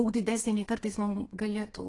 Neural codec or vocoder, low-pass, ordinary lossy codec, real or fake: codec, 32 kHz, 1.9 kbps, SNAC; 10.8 kHz; AAC, 32 kbps; fake